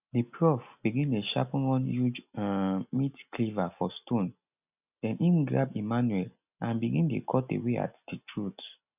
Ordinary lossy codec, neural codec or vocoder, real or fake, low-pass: none; none; real; 3.6 kHz